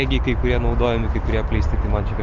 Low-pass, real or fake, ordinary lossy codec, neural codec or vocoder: 7.2 kHz; real; Opus, 32 kbps; none